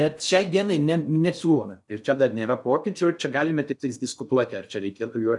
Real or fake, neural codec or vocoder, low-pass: fake; codec, 16 kHz in and 24 kHz out, 0.6 kbps, FocalCodec, streaming, 4096 codes; 10.8 kHz